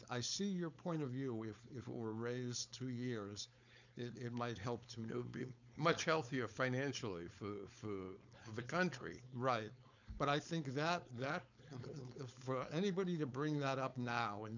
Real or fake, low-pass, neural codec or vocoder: fake; 7.2 kHz; codec, 16 kHz, 4.8 kbps, FACodec